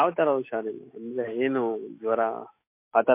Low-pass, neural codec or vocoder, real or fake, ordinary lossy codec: 3.6 kHz; autoencoder, 48 kHz, 128 numbers a frame, DAC-VAE, trained on Japanese speech; fake; MP3, 24 kbps